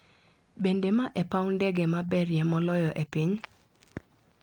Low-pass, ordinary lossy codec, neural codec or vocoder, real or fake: 19.8 kHz; Opus, 32 kbps; none; real